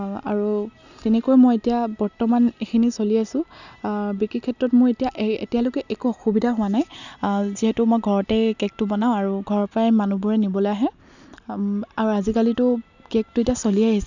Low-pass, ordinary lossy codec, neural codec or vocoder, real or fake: 7.2 kHz; none; none; real